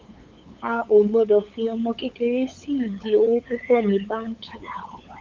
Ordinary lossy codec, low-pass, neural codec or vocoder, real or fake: Opus, 24 kbps; 7.2 kHz; codec, 16 kHz, 8 kbps, FunCodec, trained on LibriTTS, 25 frames a second; fake